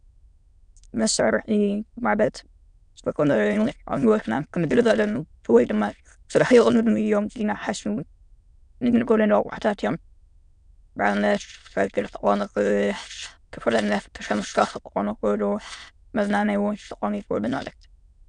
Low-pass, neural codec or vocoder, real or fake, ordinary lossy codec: 9.9 kHz; autoencoder, 22.05 kHz, a latent of 192 numbers a frame, VITS, trained on many speakers; fake; MP3, 96 kbps